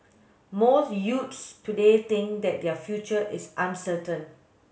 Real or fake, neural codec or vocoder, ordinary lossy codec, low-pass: real; none; none; none